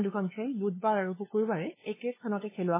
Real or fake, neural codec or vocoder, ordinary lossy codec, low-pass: fake; codec, 16 kHz, 2 kbps, FunCodec, trained on LibriTTS, 25 frames a second; MP3, 16 kbps; 3.6 kHz